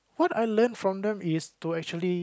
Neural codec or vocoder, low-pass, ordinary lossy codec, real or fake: none; none; none; real